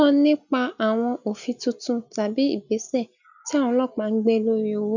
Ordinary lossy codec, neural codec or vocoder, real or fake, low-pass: none; none; real; 7.2 kHz